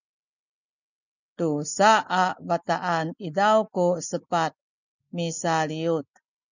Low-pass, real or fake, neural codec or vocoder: 7.2 kHz; real; none